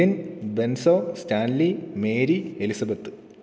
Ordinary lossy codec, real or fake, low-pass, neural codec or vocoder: none; real; none; none